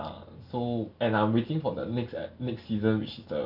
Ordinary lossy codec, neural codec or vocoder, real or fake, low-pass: none; none; real; 5.4 kHz